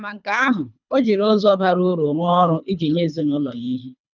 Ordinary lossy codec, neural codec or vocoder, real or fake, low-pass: none; codec, 24 kHz, 3 kbps, HILCodec; fake; 7.2 kHz